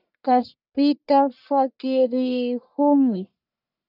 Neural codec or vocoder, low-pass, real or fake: codec, 44.1 kHz, 1.7 kbps, Pupu-Codec; 5.4 kHz; fake